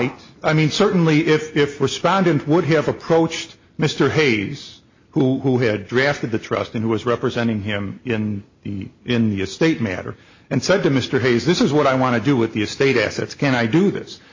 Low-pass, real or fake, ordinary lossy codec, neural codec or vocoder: 7.2 kHz; real; MP3, 32 kbps; none